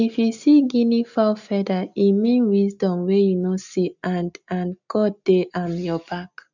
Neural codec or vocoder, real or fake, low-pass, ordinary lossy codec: none; real; 7.2 kHz; none